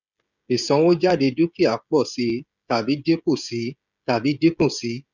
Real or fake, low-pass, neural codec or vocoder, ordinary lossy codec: fake; 7.2 kHz; codec, 16 kHz, 16 kbps, FreqCodec, smaller model; none